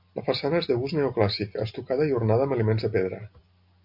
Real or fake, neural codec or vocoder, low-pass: real; none; 5.4 kHz